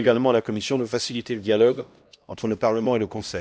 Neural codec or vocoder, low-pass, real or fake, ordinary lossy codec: codec, 16 kHz, 1 kbps, X-Codec, HuBERT features, trained on LibriSpeech; none; fake; none